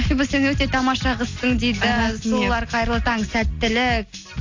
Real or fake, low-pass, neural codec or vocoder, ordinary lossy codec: real; 7.2 kHz; none; AAC, 48 kbps